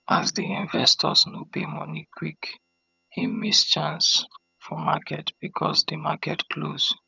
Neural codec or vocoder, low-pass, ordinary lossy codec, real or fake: vocoder, 22.05 kHz, 80 mel bands, HiFi-GAN; 7.2 kHz; none; fake